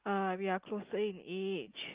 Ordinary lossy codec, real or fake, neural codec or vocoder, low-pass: Opus, 32 kbps; real; none; 3.6 kHz